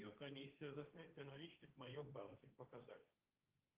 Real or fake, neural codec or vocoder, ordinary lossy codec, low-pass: fake; codec, 16 kHz, 1.1 kbps, Voila-Tokenizer; Opus, 32 kbps; 3.6 kHz